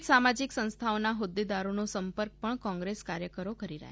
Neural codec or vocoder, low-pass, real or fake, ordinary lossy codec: none; none; real; none